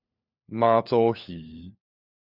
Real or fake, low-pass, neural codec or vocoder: fake; 5.4 kHz; codec, 16 kHz, 4 kbps, FunCodec, trained on LibriTTS, 50 frames a second